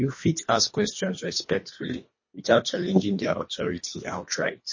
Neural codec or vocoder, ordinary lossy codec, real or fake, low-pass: codec, 24 kHz, 1.5 kbps, HILCodec; MP3, 32 kbps; fake; 7.2 kHz